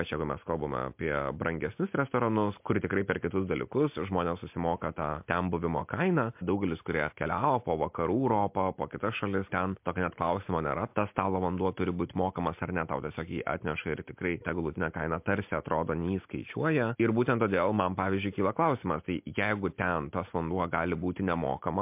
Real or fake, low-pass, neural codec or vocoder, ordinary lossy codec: real; 3.6 kHz; none; MP3, 32 kbps